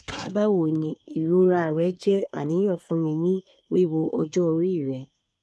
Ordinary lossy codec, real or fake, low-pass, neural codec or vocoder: none; fake; none; codec, 24 kHz, 1 kbps, SNAC